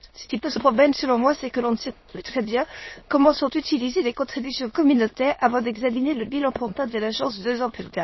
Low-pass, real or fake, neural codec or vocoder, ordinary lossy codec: 7.2 kHz; fake; autoencoder, 22.05 kHz, a latent of 192 numbers a frame, VITS, trained on many speakers; MP3, 24 kbps